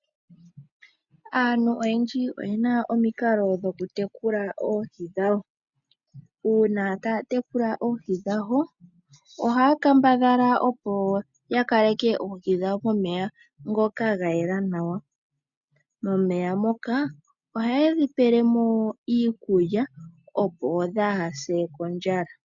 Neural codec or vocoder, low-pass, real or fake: none; 7.2 kHz; real